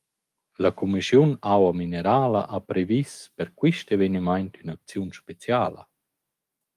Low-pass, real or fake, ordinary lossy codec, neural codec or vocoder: 14.4 kHz; fake; Opus, 32 kbps; autoencoder, 48 kHz, 128 numbers a frame, DAC-VAE, trained on Japanese speech